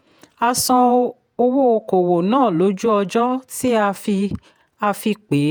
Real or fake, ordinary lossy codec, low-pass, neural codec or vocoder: fake; none; none; vocoder, 48 kHz, 128 mel bands, Vocos